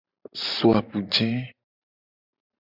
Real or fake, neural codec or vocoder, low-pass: fake; vocoder, 44.1 kHz, 128 mel bands every 512 samples, BigVGAN v2; 5.4 kHz